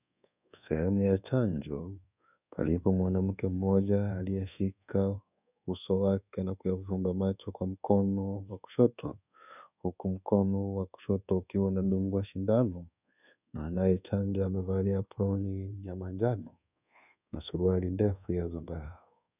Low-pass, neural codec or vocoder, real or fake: 3.6 kHz; codec, 24 kHz, 1.2 kbps, DualCodec; fake